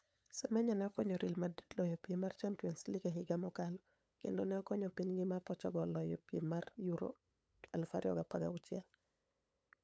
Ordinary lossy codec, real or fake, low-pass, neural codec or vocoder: none; fake; none; codec, 16 kHz, 8 kbps, FunCodec, trained on LibriTTS, 25 frames a second